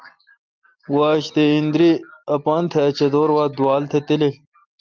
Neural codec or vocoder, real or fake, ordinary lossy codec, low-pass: none; real; Opus, 32 kbps; 7.2 kHz